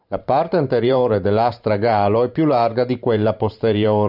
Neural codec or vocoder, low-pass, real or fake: vocoder, 44.1 kHz, 128 mel bands every 512 samples, BigVGAN v2; 5.4 kHz; fake